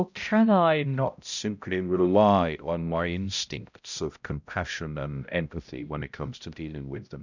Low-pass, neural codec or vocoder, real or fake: 7.2 kHz; codec, 16 kHz, 0.5 kbps, X-Codec, HuBERT features, trained on balanced general audio; fake